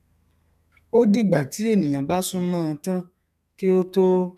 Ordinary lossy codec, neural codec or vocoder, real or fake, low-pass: none; codec, 32 kHz, 1.9 kbps, SNAC; fake; 14.4 kHz